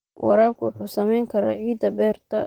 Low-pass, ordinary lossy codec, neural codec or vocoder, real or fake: 19.8 kHz; Opus, 24 kbps; vocoder, 44.1 kHz, 128 mel bands, Pupu-Vocoder; fake